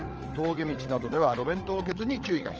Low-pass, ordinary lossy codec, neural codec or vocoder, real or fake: 7.2 kHz; Opus, 24 kbps; codec, 16 kHz, 8 kbps, FreqCodec, larger model; fake